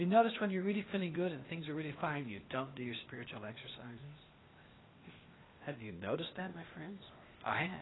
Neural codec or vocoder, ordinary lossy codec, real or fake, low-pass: codec, 16 kHz, 0.8 kbps, ZipCodec; AAC, 16 kbps; fake; 7.2 kHz